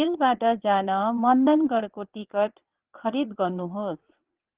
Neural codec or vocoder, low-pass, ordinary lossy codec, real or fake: vocoder, 22.05 kHz, 80 mel bands, WaveNeXt; 3.6 kHz; Opus, 16 kbps; fake